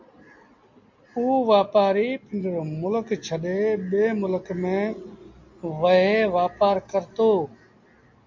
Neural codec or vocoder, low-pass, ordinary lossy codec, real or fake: none; 7.2 kHz; MP3, 48 kbps; real